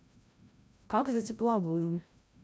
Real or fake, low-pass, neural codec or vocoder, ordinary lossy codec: fake; none; codec, 16 kHz, 0.5 kbps, FreqCodec, larger model; none